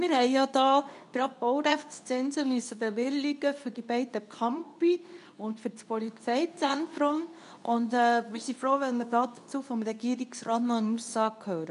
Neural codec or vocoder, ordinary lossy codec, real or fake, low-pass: codec, 24 kHz, 0.9 kbps, WavTokenizer, medium speech release version 2; none; fake; 10.8 kHz